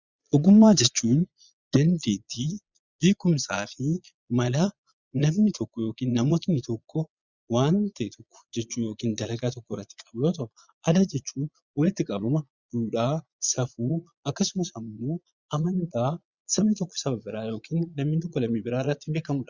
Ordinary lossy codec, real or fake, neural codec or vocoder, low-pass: Opus, 64 kbps; fake; vocoder, 22.05 kHz, 80 mel bands, Vocos; 7.2 kHz